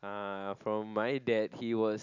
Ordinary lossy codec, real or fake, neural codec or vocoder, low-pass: none; real; none; 7.2 kHz